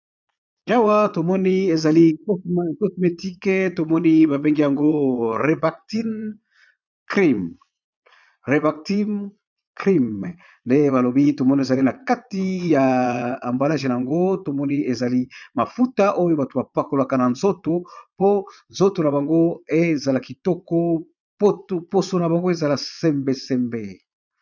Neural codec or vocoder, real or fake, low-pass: vocoder, 22.05 kHz, 80 mel bands, Vocos; fake; 7.2 kHz